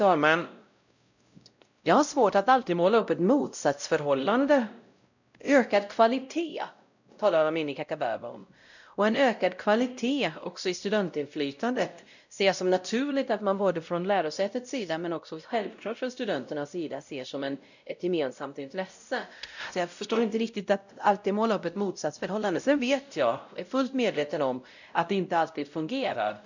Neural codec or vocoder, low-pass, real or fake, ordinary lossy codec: codec, 16 kHz, 0.5 kbps, X-Codec, WavLM features, trained on Multilingual LibriSpeech; 7.2 kHz; fake; none